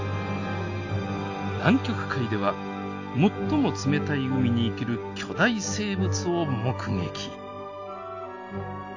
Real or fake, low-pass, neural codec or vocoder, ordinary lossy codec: real; 7.2 kHz; none; AAC, 48 kbps